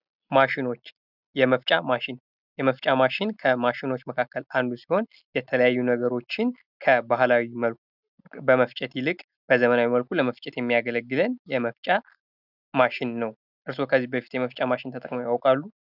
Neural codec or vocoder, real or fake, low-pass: none; real; 5.4 kHz